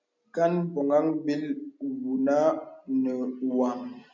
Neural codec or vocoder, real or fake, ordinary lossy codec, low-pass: none; real; MP3, 64 kbps; 7.2 kHz